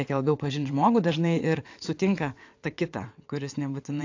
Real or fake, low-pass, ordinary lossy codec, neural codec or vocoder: fake; 7.2 kHz; AAC, 48 kbps; vocoder, 22.05 kHz, 80 mel bands, WaveNeXt